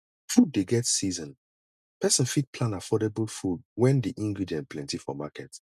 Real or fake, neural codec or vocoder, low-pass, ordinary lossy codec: real; none; 14.4 kHz; none